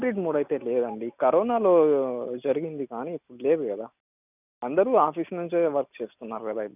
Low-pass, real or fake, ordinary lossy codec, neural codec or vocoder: 3.6 kHz; real; none; none